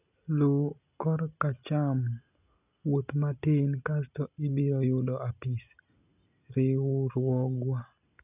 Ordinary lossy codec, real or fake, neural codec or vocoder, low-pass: none; real; none; 3.6 kHz